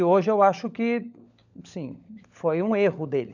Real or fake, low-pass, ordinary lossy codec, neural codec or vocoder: fake; 7.2 kHz; none; codec, 16 kHz, 16 kbps, FunCodec, trained on LibriTTS, 50 frames a second